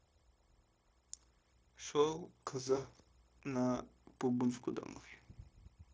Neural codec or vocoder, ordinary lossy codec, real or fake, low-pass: codec, 16 kHz, 0.9 kbps, LongCat-Audio-Codec; none; fake; none